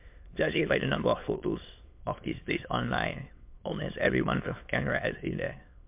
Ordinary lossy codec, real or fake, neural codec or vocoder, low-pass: MP3, 32 kbps; fake; autoencoder, 22.05 kHz, a latent of 192 numbers a frame, VITS, trained on many speakers; 3.6 kHz